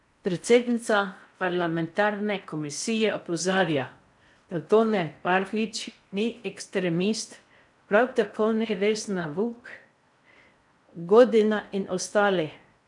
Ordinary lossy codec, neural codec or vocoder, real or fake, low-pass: none; codec, 16 kHz in and 24 kHz out, 0.6 kbps, FocalCodec, streaming, 4096 codes; fake; 10.8 kHz